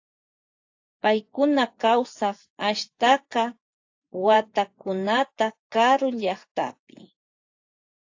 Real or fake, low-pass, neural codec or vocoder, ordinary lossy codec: fake; 7.2 kHz; vocoder, 24 kHz, 100 mel bands, Vocos; AAC, 48 kbps